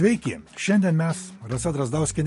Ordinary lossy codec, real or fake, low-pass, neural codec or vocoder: MP3, 48 kbps; real; 14.4 kHz; none